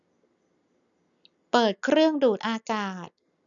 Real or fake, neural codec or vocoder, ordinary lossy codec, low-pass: real; none; none; 7.2 kHz